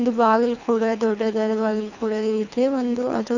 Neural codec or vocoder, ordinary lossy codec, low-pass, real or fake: codec, 24 kHz, 3 kbps, HILCodec; none; 7.2 kHz; fake